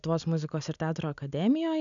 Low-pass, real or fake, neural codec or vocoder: 7.2 kHz; real; none